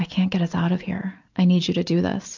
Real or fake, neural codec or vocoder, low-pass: real; none; 7.2 kHz